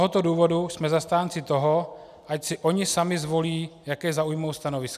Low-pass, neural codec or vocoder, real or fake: 14.4 kHz; none; real